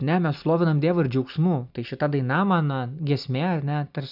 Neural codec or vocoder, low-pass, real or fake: none; 5.4 kHz; real